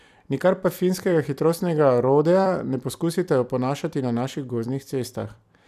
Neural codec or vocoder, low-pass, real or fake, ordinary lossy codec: vocoder, 44.1 kHz, 128 mel bands every 256 samples, BigVGAN v2; 14.4 kHz; fake; none